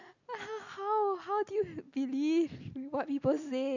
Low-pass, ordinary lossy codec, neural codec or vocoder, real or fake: 7.2 kHz; none; none; real